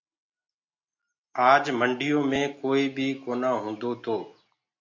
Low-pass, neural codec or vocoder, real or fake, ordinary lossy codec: 7.2 kHz; none; real; AAC, 48 kbps